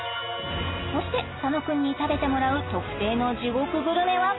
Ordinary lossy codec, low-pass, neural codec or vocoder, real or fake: AAC, 16 kbps; 7.2 kHz; none; real